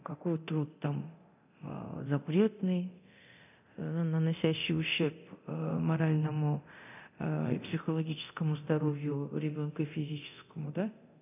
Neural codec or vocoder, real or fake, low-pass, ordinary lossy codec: codec, 24 kHz, 0.9 kbps, DualCodec; fake; 3.6 kHz; none